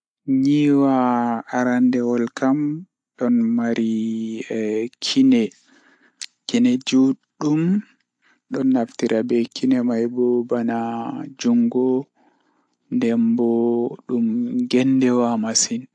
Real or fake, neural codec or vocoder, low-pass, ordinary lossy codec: real; none; 9.9 kHz; AAC, 64 kbps